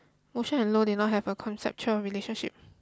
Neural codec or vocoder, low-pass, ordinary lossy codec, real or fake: none; none; none; real